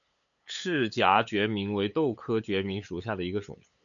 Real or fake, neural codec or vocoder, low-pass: fake; codec, 16 kHz, 8 kbps, FunCodec, trained on LibriTTS, 25 frames a second; 7.2 kHz